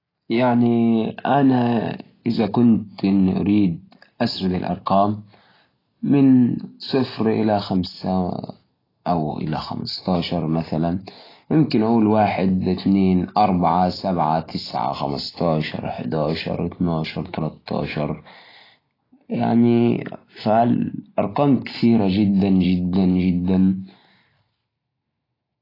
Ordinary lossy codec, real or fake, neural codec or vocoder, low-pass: AAC, 24 kbps; real; none; 5.4 kHz